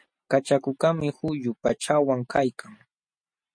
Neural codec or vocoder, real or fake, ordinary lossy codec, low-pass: none; real; MP3, 48 kbps; 9.9 kHz